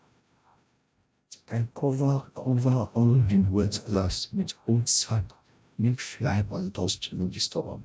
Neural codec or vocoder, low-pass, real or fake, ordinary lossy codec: codec, 16 kHz, 0.5 kbps, FreqCodec, larger model; none; fake; none